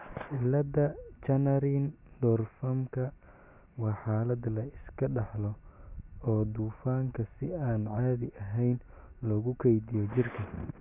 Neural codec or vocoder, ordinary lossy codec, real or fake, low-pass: none; Opus, 64 kbps; real; 3.6 kHz